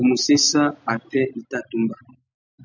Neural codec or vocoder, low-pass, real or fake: none; 7.2 kHz; real